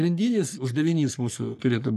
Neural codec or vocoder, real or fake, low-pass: codec, 44.1 kHz, 3.4 kbps, Pupu-Codec; fake; 14.4 kHz